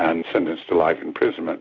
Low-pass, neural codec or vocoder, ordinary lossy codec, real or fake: 7.2 kHz; none; AAC, 48 kbps; real